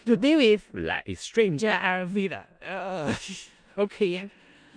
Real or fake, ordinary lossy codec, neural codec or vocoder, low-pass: fake; none; codec, 16 kHz in and 24 kHz out, 0.4 kbps, LongCat-Audio-Codec, four codebook decoder; 9.9 kHz